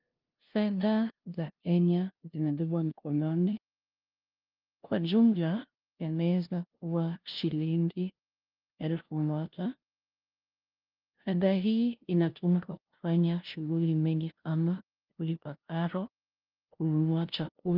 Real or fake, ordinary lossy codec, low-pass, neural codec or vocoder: fake; Opus, 32 kbps; 5.4 kHz; codec, 16 kHz, 0.5 kbps, FunCodec, trained on LibriTTS, 25 frames a second